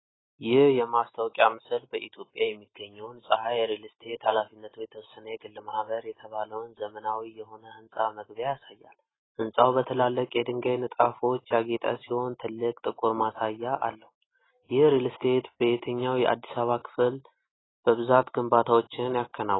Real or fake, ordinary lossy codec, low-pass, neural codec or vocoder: real; AAC, 16 kbps; 7.2 kHz; none